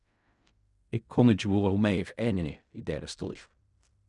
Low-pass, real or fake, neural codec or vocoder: 10.8 kHz; fake; codec, 16 kHz in and 24 kHz out, 0.4 kbps, LongCat-Audio-Codec, fine tuned four codebook decoder